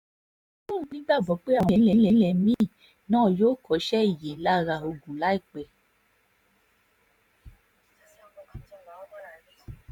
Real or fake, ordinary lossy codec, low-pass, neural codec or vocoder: fake; MP3, 96 kbps; 19.8 kHz; vocoder, 44.1 kHz, 128 mel bands every 512 samples, BigVGAN v2